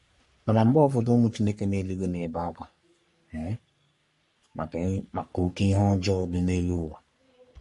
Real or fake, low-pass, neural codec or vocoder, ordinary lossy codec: fake; 14.4 kHz; codec, 44.1 kHz, 3.4 kbps, Pupu-Codec; MP3, 48 kbps